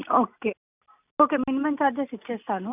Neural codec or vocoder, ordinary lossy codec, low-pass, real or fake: none; none; 3.6 kHz; real